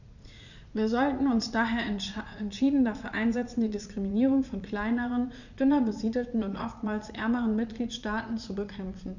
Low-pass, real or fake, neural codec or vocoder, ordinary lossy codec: 7.2 kHz; fake; vocoder, 44.1 kHz, 80 mel bands, Vocos; none